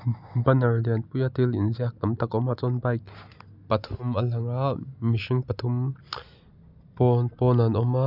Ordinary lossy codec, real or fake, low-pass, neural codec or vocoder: none; real; 5.4 kHz; none